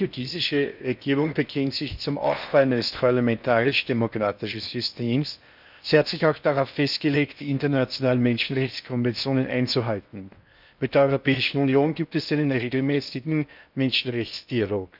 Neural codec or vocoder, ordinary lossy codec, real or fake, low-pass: codec, 16 kHz in and 24 kHz out, 0.6 kbps, FocalCodec, streaming, 4096 codes; none; fake; 5.4 kHz